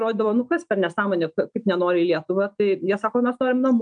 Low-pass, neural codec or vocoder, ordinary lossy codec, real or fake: 9.9 kHz; none; MP3, 96 kbps; real